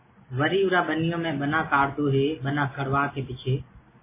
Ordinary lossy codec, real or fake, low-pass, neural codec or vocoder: MP3, 16 kbps; real; 3.6 kHz; none